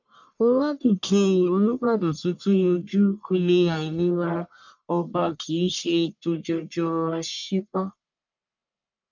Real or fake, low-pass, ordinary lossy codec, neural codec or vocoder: fake; 7.2 kHz; none; codec, 44.1 kHz, 1.7 kbps, Pupu-Codec